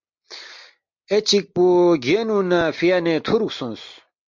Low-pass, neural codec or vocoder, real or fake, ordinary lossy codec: 7.2 kHz; none; real; MP3, 48 kbps